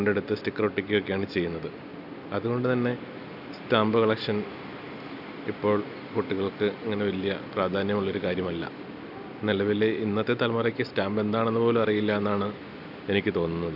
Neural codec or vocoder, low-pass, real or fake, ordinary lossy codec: none; 5.4 kHz; real; none